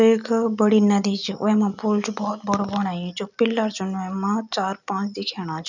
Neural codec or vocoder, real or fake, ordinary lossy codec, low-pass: none; real; none; 7.2 kHz